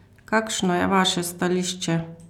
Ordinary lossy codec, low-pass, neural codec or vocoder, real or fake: none; 19.8 kHz; none; real